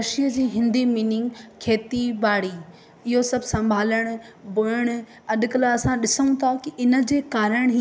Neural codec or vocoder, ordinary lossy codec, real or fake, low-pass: none; none; real; none